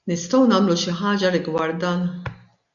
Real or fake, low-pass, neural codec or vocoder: real; 7.2 kHz; none